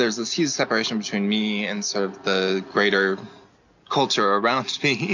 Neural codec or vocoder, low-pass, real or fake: none; 7.2 kHz; real